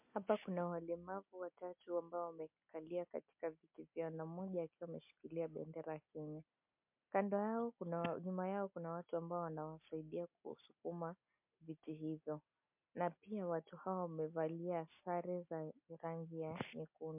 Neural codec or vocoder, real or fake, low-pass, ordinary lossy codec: none; real; 3.6 kHz; MP3, 32 kbps